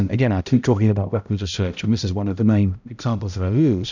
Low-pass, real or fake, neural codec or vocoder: 7.2 kHz; fake; codec, 16 kHz, 0.5 kbps, X-Codec, HuBERT features, trained on balanced general audio